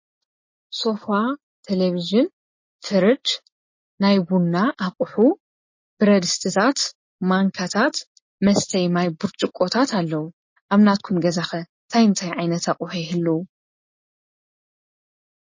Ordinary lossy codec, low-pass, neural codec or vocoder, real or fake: MP3, 32 kbps; 7.2 kHz; none; real